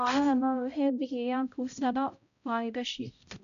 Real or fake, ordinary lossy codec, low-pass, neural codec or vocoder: fake; none; 7.2 kHz; codec, 16 kHz, 0.5 kbps, X-Codec, HuBERT features, trained on balanced general audio